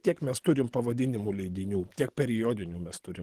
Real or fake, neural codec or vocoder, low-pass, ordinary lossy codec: fake; codec, 44.1 kHz, 7.8 kbps, DAC; 14.4 kHz; Opus, 16 kbps